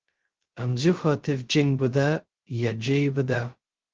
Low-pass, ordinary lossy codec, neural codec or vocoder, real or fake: 7.2 kHz; Opus, 16 kbps; codec, 16 kHz, 0.2 kbps, FocalCodec; fake